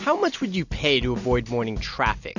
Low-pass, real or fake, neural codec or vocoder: 7.2 kHz; real; none